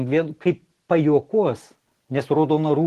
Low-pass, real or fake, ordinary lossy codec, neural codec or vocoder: 14.4 kHz; real; Opus, 16 kbps; none